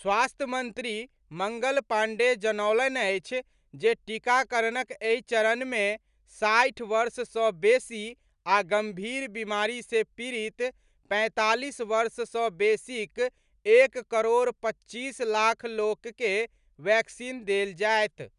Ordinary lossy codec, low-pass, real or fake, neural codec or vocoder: none; 10.8 kHz; real; none